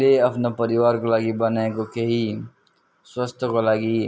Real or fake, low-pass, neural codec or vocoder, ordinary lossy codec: real; none; none; none